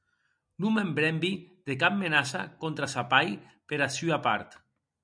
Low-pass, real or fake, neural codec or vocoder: 9.9 kHz; real; none